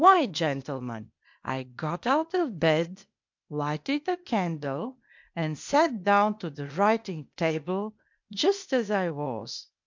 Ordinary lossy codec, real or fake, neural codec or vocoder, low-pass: MP3, 64 kbps; fake; codec, 16 kHz, 0.8 kbps, ZipCodec; 7.2 kHz